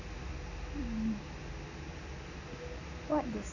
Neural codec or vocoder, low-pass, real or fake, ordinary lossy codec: none; 7.2 kHz; real; none